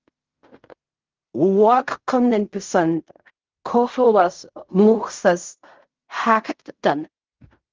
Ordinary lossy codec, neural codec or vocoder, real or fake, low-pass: Opus, 32 kbps; codec, 16 kHz in and 24 kHz out, 0.4 kbps, LongCat-Audio-Codec, fine tuned four codebook decoder; fake; 7.2 kHz